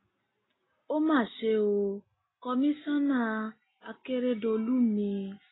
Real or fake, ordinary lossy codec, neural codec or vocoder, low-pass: real; AAC, 16 kbps; none; 7.2 kHz